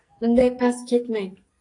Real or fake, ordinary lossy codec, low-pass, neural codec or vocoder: fake; AAC, 48 kbps; 10.8 kHz; codec, 44.1 kHz, 2.6 kbps, SNAC